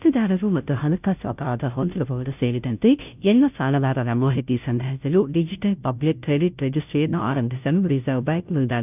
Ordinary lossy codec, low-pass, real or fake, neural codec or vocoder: none; 3.6 kHz; fake; codec, 16 kHz, 0.5 kbps, FunCodec, trained on Chinese and English, 25 frames a second